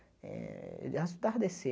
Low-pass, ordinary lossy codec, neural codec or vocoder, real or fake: none; none; none; real